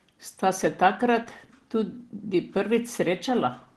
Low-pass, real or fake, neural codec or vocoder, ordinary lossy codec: 10.8 kHz; real; none; Opus, 16 kbps